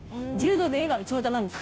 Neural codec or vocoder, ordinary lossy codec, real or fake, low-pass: codec, 16 kHz, 0.5 kbps, FunCodec, trained on Chinese and English, 25 frames a second; none; fake; none